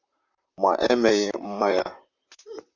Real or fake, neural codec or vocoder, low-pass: fake; vocoder, 44.1 kHz, 128 mel bands, Pupu-Vocoder; 7.2 kHz